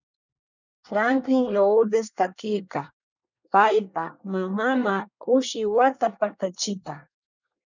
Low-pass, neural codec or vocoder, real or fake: 7.2 kHz; codec, 24 kHz, 1 kbps, SNAC; fake